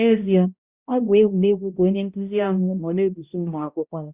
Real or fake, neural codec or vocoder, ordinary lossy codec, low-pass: fake; codec, 16 kHz, 0.5 kbps, X-Codec, HuBERT features, trained on balanced general audio; Opus, 64 kbps; 3.6 kHz